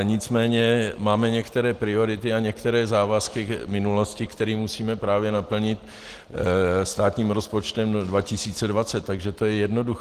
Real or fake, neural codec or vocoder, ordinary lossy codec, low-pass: real; none; Opus, 16 kbps; 14.4 kHz